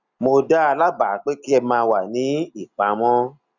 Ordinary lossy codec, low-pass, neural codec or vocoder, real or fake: none; 7.2 kHz; none; real